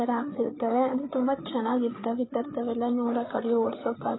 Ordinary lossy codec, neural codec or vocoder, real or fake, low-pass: AAC, 16 kbps; codec, 16 kHz, 8 kbps, FreqCodec, larger model; fake; 7.2 kHz